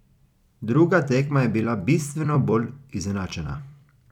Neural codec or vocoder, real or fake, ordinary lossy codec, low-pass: vocoder, 44.1 kHz, 128 mel bands every 256 samples, BigVGAN v2; fake; none; 19.8 kHz